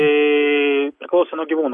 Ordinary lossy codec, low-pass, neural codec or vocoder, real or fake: AAC, 64 kbps; 10.8 kHz; none; real